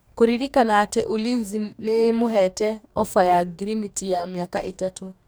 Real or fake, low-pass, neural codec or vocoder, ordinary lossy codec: fake; none; codec, 44.1 kHz, 2.6 kbps, DAC; none